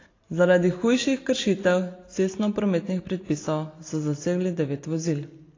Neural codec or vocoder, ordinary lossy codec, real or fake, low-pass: none; AAC, 32 kbps; real; 7.2 kHz